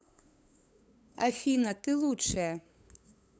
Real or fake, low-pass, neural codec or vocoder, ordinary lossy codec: fake; none; codec, 16 kHz, 8 kbps, FunCodec, trained on LibriTTS, 25 frames a second; none